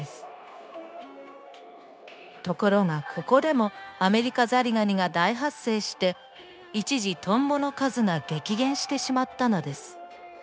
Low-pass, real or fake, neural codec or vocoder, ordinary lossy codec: none; fake; codec, 16 kHz, 0.9 kbps, LongCat-Audio-Codec; none